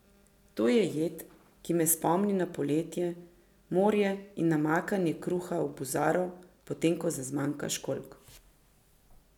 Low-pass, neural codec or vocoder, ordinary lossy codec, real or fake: 19.8 kHz; none; none; real